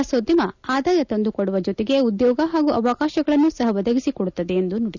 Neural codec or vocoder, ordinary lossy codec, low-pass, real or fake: none; none; 7.2 kHz; real